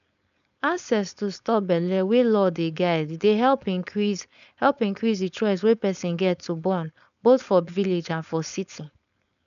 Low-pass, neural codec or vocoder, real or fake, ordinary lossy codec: 7.2 kHz; codec, 16 kHz, 4.8 kbps, FACodec; fake; none